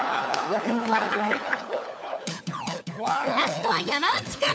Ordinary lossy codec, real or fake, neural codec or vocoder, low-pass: none; fake; codec, 16 kHz, 4 kbps, FunCodec, trained on LibriTTS, 50 frames a second; none